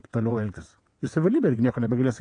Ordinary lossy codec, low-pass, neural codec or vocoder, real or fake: AAC, 48 kbps; 9.9 kHz; vocoder, 22.05 kHz, 80 mel bands, WaveNeXt; fake